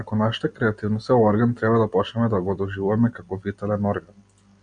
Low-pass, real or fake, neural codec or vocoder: 9.9 kHz; real; none